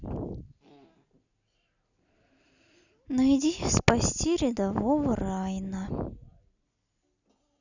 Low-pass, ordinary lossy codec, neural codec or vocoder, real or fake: 7.2 kHz; none; none; real